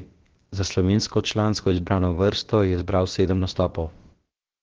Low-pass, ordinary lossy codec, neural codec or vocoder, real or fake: 7.2 kHz; Opus, 16 kbps; codec, 16 kHz, about 1 kbps, DyCAST, with the encoder's durations; fake